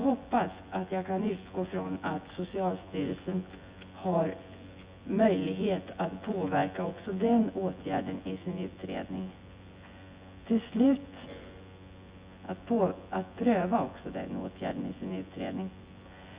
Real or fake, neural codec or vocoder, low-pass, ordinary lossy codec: fake; vocoder, 24 kHz, 100 mel bands, Vocos; 3.6 kHz; Opus, 64 kbps